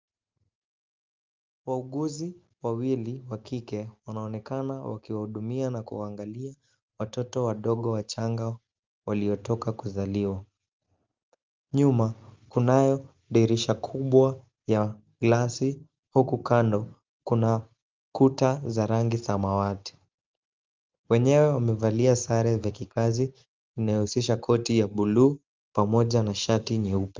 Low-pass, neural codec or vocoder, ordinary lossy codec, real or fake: 7.2 kHz; none; Opus, 32 kbps; real